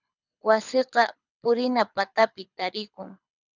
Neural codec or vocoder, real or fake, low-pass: codec, 24 kHz, 6 kbps, HILCodec; fake; 7.2 kHz